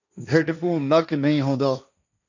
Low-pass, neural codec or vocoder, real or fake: 7.2 kHz; codec, 16 kHz, 1.1 kbps, Voila-Tokenizer; fake